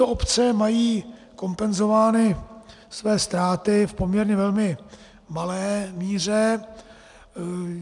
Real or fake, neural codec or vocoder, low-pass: real; none; 10.8 kHz